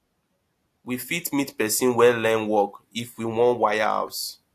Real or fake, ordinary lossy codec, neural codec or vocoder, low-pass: real; AAC, 64 kbps; none; 14.4 kHz